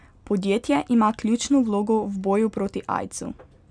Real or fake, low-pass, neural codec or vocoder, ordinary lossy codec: real; 9.9 kHz; none; none